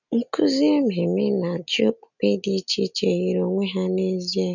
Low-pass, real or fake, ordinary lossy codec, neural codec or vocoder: 7.2 kHz; real; none; none